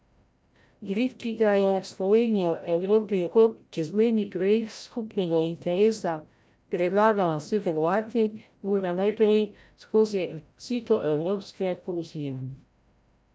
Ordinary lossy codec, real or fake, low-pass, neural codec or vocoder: none; fake; none; codec, 16 kHz, 0.5 kbps, FreqCodec, larger model